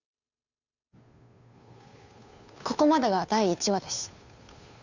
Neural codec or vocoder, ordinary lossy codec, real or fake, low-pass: codec, 16 kHz, 2 kbps, FunCodec, trained on Chinese and English, 25 frames a second; none; fake; 7.2 kHz